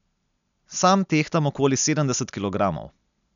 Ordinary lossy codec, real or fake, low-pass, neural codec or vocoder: none; real; 7.2 kHz; none